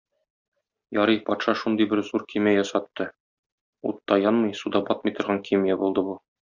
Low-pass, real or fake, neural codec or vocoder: 7.2 kHz; real; none